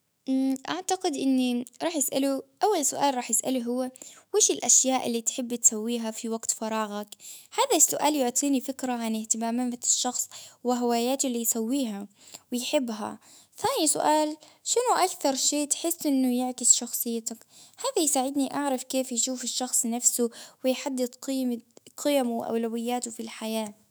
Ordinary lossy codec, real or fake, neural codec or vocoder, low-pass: none; fake; autoencoder, 48 kHz, 128 numbers a frame, DAC-VAE, trained on Japanese speech; none